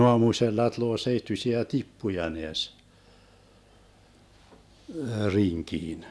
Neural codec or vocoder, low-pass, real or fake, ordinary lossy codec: none; none; real; none